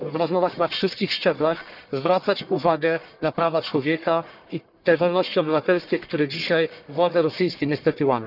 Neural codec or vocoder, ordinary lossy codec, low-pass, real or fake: codec, 44.1 kHz, 1.7 kbps, Pupu-Codec; none; 5.4 kHz; fake